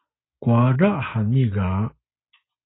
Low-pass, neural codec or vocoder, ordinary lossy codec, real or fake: 7.2 kHz; none; AAC, 16 kbps; real